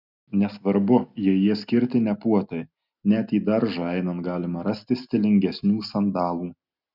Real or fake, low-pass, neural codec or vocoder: real; 5.4 kHz; none